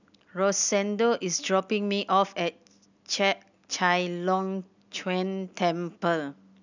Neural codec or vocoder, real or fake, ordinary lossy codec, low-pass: none; real; none; 7.2 kHz